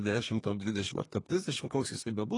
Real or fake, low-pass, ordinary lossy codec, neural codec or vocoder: fake; 10.8 kHz; AAC, 32 kbps; codec, 32 kHz, 1.9 kbps, SNAC